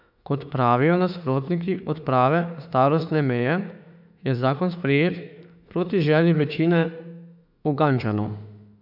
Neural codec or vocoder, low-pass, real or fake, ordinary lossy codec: autoencoder, 48 kHz, 32 numbers a frame, DAC-VAE, trained on Japanese speech; 5.4 kHz; fake; none